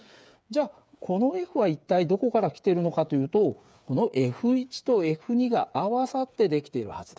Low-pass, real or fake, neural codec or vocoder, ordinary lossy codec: none; fake; codec, 16 kHz, 8 kbps, FreqCodec, smaller model; none